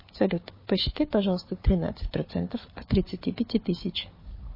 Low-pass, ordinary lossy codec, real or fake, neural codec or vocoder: 5.4 kHz; MP3, 24 kbps; fake; codec, 16 kHz, 4 kbps, FunCodec, trained on Chinese and English, 50 frames a second